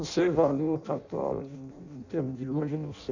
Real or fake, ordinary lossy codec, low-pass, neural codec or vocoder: fake; none; 7.2 kHz; codec, 16 kHz in and 24 kHz out, 0.6 kbps, FireRedTTS-2 codec